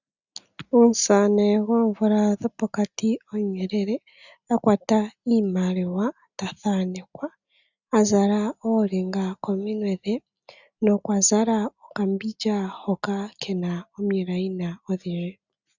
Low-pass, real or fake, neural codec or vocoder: 7.2 kHz; real; none